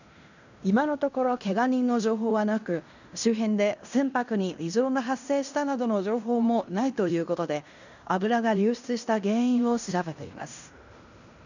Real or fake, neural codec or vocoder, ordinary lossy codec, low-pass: fake; codec, 16 kHz in and 24 kHz out, 0.9 kbps, LongCat-Audio-Codec, fine tuned four codebook decoder; none; 7.2 kHz